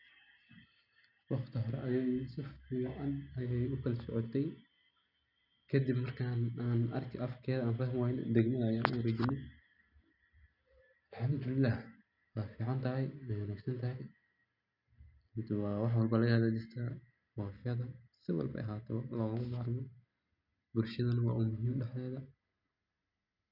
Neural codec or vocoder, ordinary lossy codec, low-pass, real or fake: none; none; 5.4 kHz; real